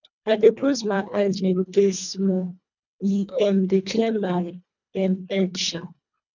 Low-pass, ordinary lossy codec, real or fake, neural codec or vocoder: 7.2 kHz; none; fake; codec, 24 kHz, 1.5 kbps, HILCodec